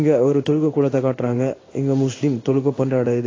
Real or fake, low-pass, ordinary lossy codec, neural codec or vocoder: fake; 7.2 kHz; AAC, 32 kbps; codec, 16 kHz in and 24 kHz out, 1 kbps, XY-Tokenizer